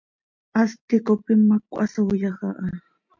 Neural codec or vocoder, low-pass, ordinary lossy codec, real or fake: none; 7.2 kHz; MP3, 48 kbps; real